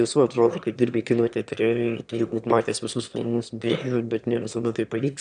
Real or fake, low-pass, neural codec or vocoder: fake; 9.9 kHz; autoencoder, 22.05 kHz, a latent of 192 numbers a frame, VITS, trained on one speaker